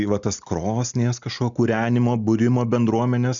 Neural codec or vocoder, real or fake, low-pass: none; real; 7.2 kHz